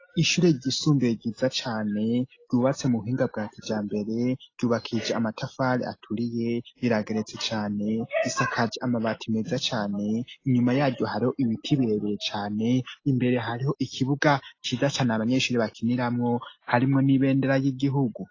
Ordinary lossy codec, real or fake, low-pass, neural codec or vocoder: AAC, 32 kbps; real; 7.2 kHz; none